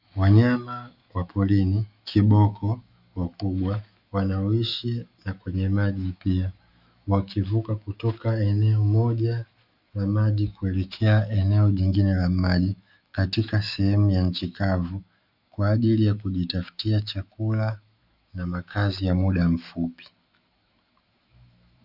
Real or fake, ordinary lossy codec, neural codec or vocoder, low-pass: fake; Opus, 64 kbps; autoencoder, 48 kHz, 128 numbers a frame, DAC-VAE, trained on Japanese speech; 5.4 kHz